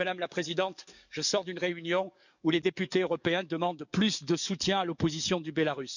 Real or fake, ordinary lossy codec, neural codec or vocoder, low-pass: fake; none; codec, 44.1 kHz, 7.8 kbps, DAC; 7.2 kHz